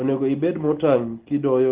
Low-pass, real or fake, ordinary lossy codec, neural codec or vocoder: 3.6 kHz; real; Opus, 16 kbps; none